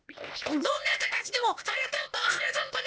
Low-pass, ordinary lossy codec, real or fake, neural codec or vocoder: none; none; fake; codec, 16 kHz, 0.8 kbps, ZipCodec